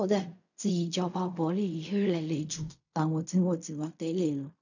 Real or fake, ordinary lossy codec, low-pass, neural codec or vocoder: fake; none; 7.2 kHz; codec, 16 kHz in and 24 kHz out, 0.4 kbps, LongCat-Audio-Codec, fine tuned four codebook decoder